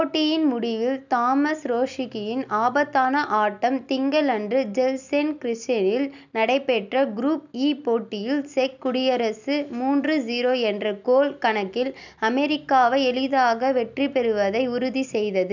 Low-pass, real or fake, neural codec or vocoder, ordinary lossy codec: 7.2 kHz; real; none; none